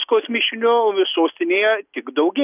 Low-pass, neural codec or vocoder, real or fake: 3.6 kHz; none; real